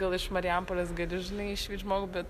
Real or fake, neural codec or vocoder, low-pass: real; none; 14.4 kHz